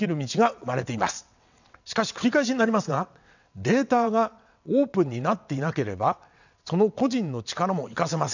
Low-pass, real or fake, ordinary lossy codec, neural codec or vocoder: 7.2 kHz; fake; none; vocoder, 22.05 kHz, 80 mel bands, WaveNeXt